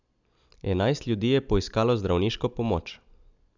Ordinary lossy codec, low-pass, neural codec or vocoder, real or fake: none; 7.2 kHz; none; real